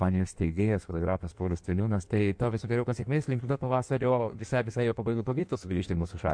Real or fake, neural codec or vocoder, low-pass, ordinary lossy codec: fake; codec, 16 kHz in and 24 kHz out, 1.1 kbps, FireRedTTS-2 codec; 9.9 kHz; MP3, 64 kbps